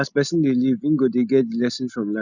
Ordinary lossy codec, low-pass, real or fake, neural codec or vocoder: none; 7.2 kHz; real; none